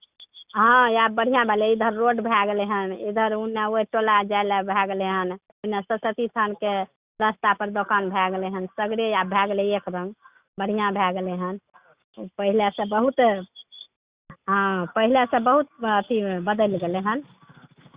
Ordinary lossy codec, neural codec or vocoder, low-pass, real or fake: Opus, 64 kbps; none; 3.6 kHz; real